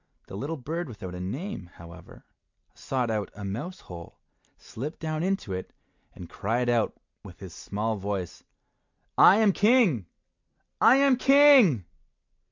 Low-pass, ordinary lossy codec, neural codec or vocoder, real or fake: 7.2 kHz; MP3, 64 kbps; none; real